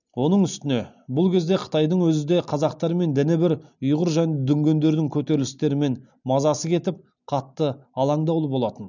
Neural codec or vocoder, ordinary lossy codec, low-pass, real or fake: none; none; 7.2 kHz; real